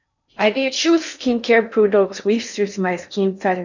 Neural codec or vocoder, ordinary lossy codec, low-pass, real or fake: codec, 16 kHz in and 24 kHz out, 0.6 kbps, FocalCodec, streaming, 4096 codes; none; 7.2 kHz; fake